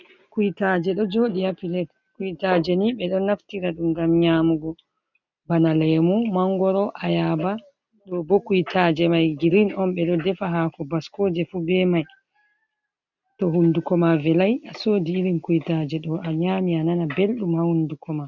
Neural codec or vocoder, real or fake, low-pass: none; real; 7.2 kHz